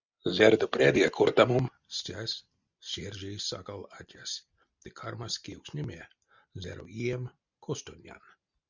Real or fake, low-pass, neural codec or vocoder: real; 7.2 kHz; none